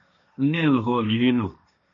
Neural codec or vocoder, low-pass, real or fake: codec, 16 kHz, 1.1 kbps, Voila-Tokenizer; 7.2 kHz; fake